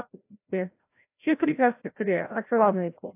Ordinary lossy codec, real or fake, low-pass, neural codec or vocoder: AAC, 24 kbps; fake; 3.6 kHz; codec, 16 kHz, 0.5 kbps, FreqCodec, larger model